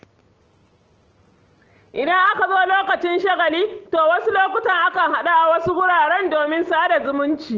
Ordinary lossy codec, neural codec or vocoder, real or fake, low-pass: Opus, 16 kbps; none; real; 7.2 kHz